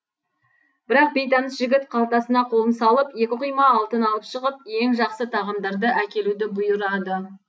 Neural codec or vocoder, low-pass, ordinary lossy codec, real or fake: none; none; none; real